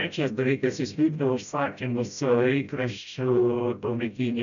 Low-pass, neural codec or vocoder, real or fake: 7.2 kHz; codec, 16 kHz, 0.5 kbps, FreqCodec, smaller model; fake